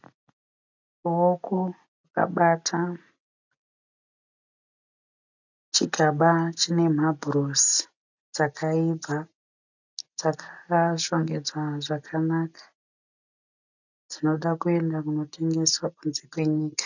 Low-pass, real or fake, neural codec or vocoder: 7.2 kHz; real; none